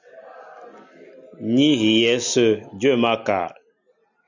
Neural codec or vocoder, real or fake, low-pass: none; real; 7.2 kHz